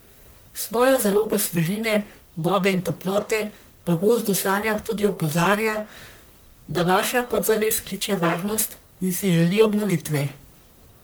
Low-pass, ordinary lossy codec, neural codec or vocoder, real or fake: none; none; codec, 44.1 kHz, 1.7 kbps, Pupu-Codec; fake